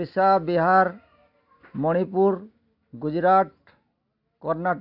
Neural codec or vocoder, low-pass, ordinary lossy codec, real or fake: none; 5.4 kHz; AAC, 48 kbps; real